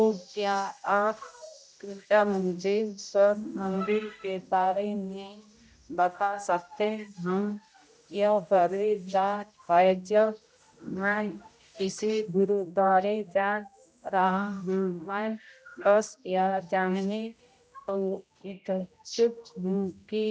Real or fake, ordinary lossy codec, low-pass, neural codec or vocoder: fake; none; none; codec, 16 kHz, 0.5 kbps, X-Codec, HuBERT features, trained on general audio